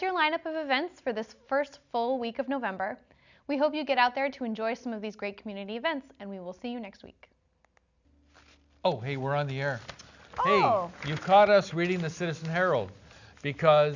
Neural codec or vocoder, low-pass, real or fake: none; 7.2 kHz; real